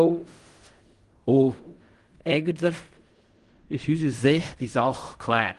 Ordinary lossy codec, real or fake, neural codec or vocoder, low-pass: Opus, 24 kbps; fake; codec, 16 kHz in and 24 kHz out, 0.4 kbps, LongCat-Audio-Codec, fine tuned four codebook decoder; 10.8 kHz